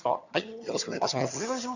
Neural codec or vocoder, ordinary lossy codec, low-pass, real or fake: vocoder, 22.05 kHz, 80 mel bands, HiFi-GAN; none; 7.2 kHz; fake